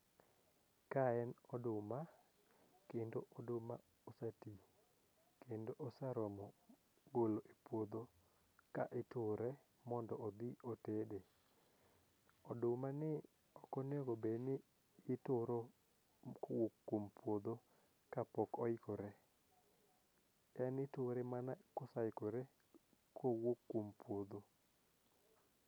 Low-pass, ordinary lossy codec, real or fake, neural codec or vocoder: none; none; real; none